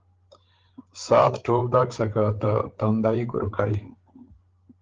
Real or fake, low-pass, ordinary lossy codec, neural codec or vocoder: fake; 7.2 kHz; Opus, 16 kbps; codec, 16 kHz, 8 kbps, FreqCodec, larger model